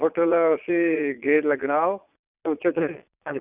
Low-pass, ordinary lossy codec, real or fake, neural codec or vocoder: 3.6 kHz; none; fake; vocoder, 22.05 kHz, 80 mel bands, Vocos